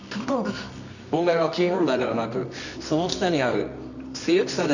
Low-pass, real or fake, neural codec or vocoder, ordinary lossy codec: 7.2 kHz; fake; codec, 24 kHz, 0.9 kbps, WavTokenizer, medium music audio release; none